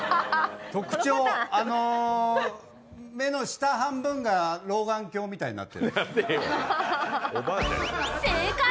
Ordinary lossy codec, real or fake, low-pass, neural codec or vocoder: none; real; none; none